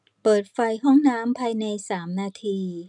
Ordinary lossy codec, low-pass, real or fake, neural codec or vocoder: none; 10.8 kHz; real; none